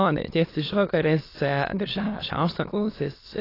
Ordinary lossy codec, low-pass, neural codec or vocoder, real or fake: AAC, 24 kbps; 5.4 kHz; autoencoder, 22.05 kHz, a latent of 192 numbers a frame, VITS, trained on many speakers; fake